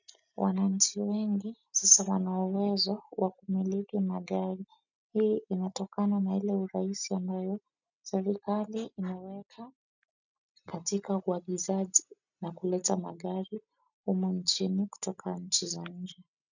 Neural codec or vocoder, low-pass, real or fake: none; 7.2 kHz; real